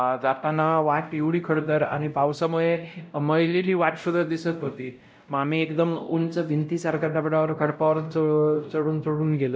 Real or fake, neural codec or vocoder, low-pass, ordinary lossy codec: fake; codec, 16 kHz, 0.5 kbps, X-Codec, WavLM features, trained on Multilingual LibriSpeech; none; none